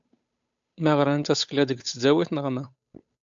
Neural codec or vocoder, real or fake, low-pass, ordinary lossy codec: codec, 16 kHz, 8 kbps, FunCodec, trained on Chinese and English, 25 frames a second; fake; 7.2 kHz; MP3, 64 kbps